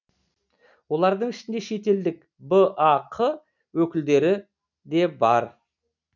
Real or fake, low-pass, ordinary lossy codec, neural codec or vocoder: real; 7.2 kHz; none; none